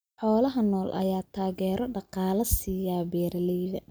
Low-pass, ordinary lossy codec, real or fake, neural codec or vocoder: none; none; real; none